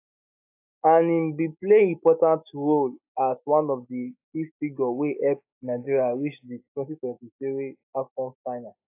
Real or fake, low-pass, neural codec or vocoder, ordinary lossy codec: fake; 3.6 kHz; autoencoder, 48 kHz, 128 numbers a frame, DAC-VAE, trained on Japanese speech; AAC, 32 kbps